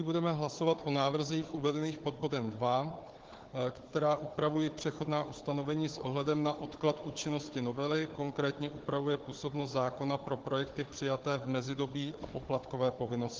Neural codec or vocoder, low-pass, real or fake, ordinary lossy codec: codec, 16 kHz, 4 kbps, FunCodec, trained on Chinese and English, 50 frames a second; 7.2 kHz; fake; Opus, 16 kbps